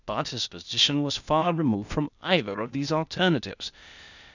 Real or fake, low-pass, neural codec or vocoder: fake; 7.2 kHz; codec, 16 kHz, 0.8 kbps, ZipCodec